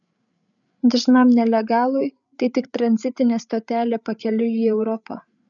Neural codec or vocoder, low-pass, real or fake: codec, 16 kHz, 8 kbps, FreqCodec, larger model; 7.2 kHz; fake